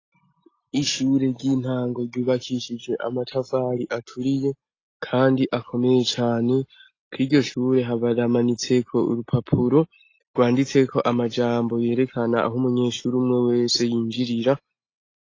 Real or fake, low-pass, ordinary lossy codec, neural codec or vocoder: real; 7.2 kHz; AAC, 32 kbps; none